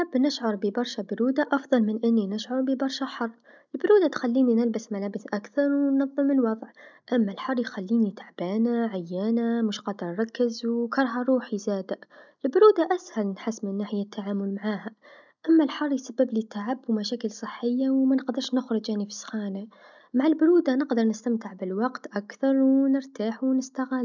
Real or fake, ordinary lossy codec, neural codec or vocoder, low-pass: real; none; none; 7.2 kHz